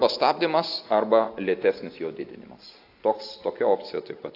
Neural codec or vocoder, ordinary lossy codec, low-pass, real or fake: none; AAC, 32 kbps; 5.4 kHz; real